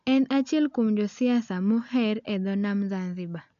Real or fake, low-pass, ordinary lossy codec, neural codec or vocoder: real; 7.2 kHz; none; none